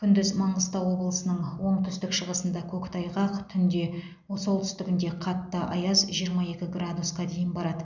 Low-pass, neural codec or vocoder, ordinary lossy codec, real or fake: 7.2 kHz; none; none; real